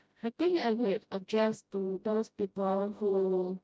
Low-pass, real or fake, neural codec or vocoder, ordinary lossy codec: none; fake; codec, 16 kHz, 0.5 kbps, FreqCodec, smaller model; none